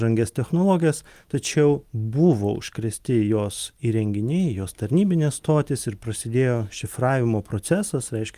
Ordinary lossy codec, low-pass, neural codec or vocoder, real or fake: Opus, 32 kbps; 14.4 kHz; none; real